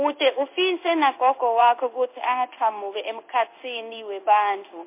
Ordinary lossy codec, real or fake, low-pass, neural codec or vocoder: MP3, 24 kbps; fake; 3.6 kHz; codec, 16 kHz in and 24 kHz out, 1 kbps, XY-Tokenizer